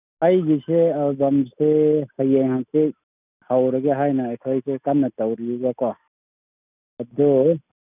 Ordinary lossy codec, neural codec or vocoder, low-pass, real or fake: none; none; 3.6 kHz; real